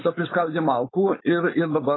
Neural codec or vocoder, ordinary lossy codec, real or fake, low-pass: codec, 16 kHz, 4.8 kbps, FACodec; AAC, 16 kbps; fake; 7.2 kHz